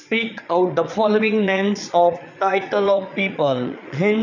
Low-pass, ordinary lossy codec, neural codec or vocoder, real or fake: 7.2 kHz; none; vocoder, 22.05 kHz, 80 mel bands, Vocos; fake